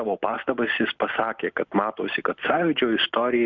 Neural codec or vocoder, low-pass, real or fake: none; 7.2 kHz; real